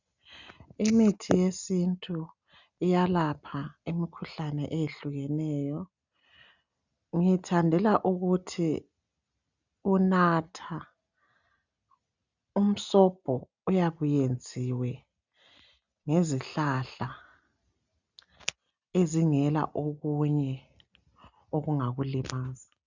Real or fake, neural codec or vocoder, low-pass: real; none; 7.2 kHz